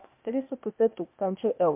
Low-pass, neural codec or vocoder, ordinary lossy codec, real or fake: 3.6 kHz; codec, 16 kHz, 0.8 kbps, ZipCodec; MP3, 32 kbps; fake